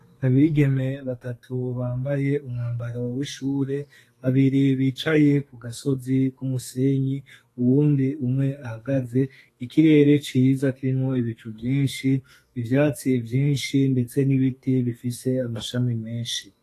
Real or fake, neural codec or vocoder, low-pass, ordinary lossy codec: fake; codec, 32 kHz, 1.9 kbps, SNAC; 14.4 kHz; AAC, 48 kbps